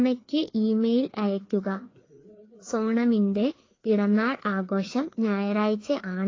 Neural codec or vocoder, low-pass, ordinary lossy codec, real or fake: codec, 16 kHz, 2 kbps, FreqCodec, larger model; 7.2 kHz; AAC, 32 kbps; fake